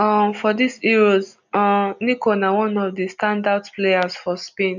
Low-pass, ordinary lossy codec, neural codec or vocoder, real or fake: 7.2 kHz; none; none; real